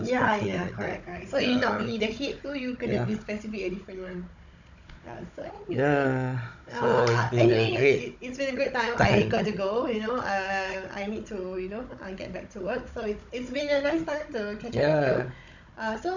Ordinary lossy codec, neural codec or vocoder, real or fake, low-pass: none; codec, 16 kHz, 16 kbps, FunCodec, trained on Chinese and English, 50 frames a second; fake; 7.2 kHz